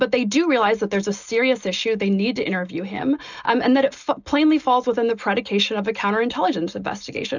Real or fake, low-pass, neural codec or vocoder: real; 7.2 kHz; none